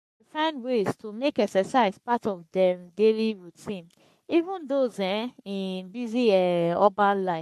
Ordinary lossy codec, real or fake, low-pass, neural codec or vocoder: MP3, 64 kbps; fake; 14.4 kHz; codec, 44.1 kHz, 3.4 kbps, Pupu-Codec